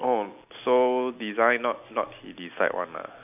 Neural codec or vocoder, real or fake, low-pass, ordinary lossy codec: none; real; 3.6 kHz; none